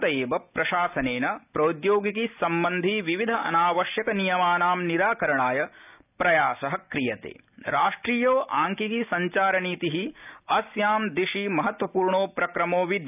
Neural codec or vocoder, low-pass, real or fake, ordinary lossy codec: none; 3.6 kHz; real; none